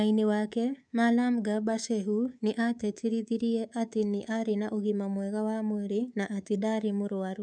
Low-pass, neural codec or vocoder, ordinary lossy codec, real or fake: 9.9 kHz; codec, 24 kHz, 3.1 kbps, DualCodec; none; fake